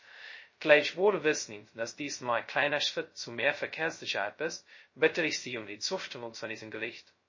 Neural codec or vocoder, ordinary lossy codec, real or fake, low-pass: codec, 16 kHz, 0.2 kbps, FocalCodec; MP3, 32 kbps; fake; 7.2 kHz